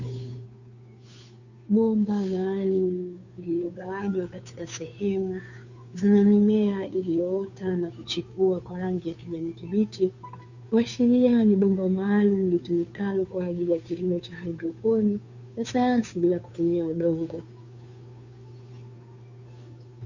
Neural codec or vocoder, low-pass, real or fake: codec, 16 kHz, 2 kbps, FunCodec, trained on Chinese and English, 25 frames a second; 7.2 kHz; fake